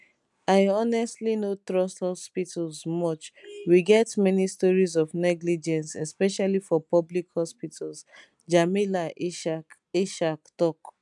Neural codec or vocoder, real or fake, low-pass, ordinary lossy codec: none; real; 10.8 kHz; none